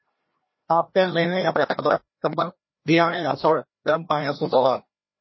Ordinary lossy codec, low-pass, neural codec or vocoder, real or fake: MP3, 24 kbps; 7.2 kHz; codec, 16 kHz, 1 kbps, FreqCodec, larger model; fake